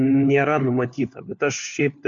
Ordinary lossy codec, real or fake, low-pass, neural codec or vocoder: MP3, 64 kbps; fake; 7.2 kHz; codec, 16 kHz, 4 kbps, FunCodec, trained on LibriTTS, 50 frames a second